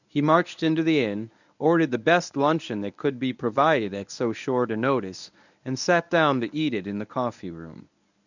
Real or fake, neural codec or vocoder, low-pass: fake; codec, 24 kHz, 0.9 kbps, WavTokenizer, medium speech release version 1; 7.2 kHz